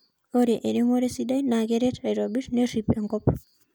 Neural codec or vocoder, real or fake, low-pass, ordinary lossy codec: none; real; none; none